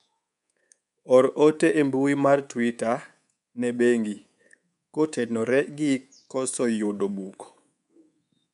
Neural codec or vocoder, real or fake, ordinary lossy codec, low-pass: codec, 24 kHz, 3.1 kbps, DualCodec; fake; MP3, 96 kbps; 10.8 kHz